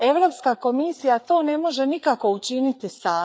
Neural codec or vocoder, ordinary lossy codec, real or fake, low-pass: codec, 16 kHz, 4 kbps, FreqCodec, larger model; none; fake; none